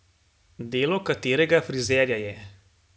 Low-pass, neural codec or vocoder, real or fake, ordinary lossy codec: none; none; real; none